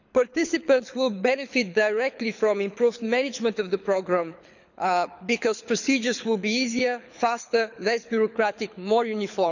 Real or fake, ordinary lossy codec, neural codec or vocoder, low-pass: fake; none; codec, 24 kHz, 6 kbps, HILCodec; 7.2 kHz